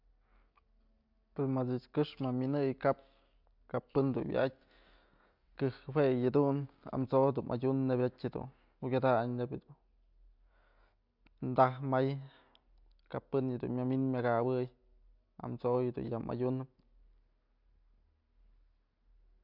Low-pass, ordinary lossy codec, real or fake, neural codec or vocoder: 5.4 kHz; MP3, 48 kbps; real; none